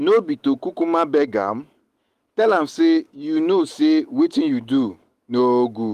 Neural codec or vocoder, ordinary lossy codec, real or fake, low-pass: autoencoder, 48 kHz, 128 numbers a frame, DAC-VAE, trained on Japanese speech; Opus, 24 kbps; fake; 14.4 kHz